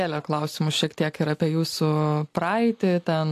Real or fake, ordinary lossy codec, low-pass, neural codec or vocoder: real; AAC, 64 kbps; 14.4 kHz; none